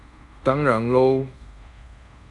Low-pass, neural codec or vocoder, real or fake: 10.8 kHz; codec, 24 kHz, 0.5 kbps, DualCodec; fake